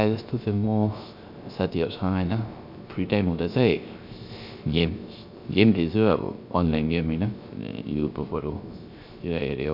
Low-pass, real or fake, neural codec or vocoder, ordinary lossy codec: 5.4 kHz; fake; codec, 16 kHz, 0.3 kbps, FocalCodec; MP3, 48 kbps